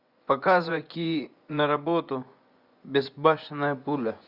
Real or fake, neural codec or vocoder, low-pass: fake; vocoder, 24 kHz, 100 mel bands, Vocos; 5.4 kHz